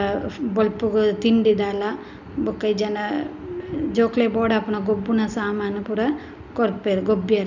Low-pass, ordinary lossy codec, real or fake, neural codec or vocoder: 7.2 kHz; none; real; none